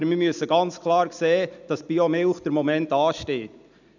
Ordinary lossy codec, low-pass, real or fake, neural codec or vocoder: none; 7.2 kHz; real; none